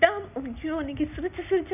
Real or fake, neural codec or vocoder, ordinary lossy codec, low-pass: real; none; none; 3.6 kHz